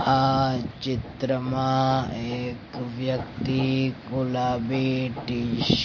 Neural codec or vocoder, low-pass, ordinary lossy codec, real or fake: none; 7.2 kHz; MP3, 32 kbps; real